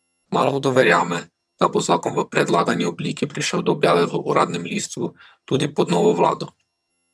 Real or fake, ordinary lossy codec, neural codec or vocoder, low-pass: fake; none; vocoder, 22.05 kHz, 80 mel bands, HiFi-GAN; none